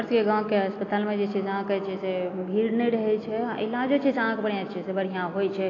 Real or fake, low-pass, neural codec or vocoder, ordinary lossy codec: real; 7.2 kHz; none; AAC, 32 kbps